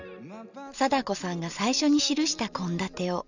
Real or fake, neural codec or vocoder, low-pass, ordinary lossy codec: real; none; 7.2 kHz; none